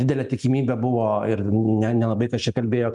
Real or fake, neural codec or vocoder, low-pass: real; none; 10.8 kHz